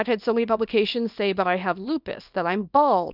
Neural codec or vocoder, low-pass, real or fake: codec, 24 kHz, 0.9 kbps, WavTokenizer, small release; 5.4 kHz; fake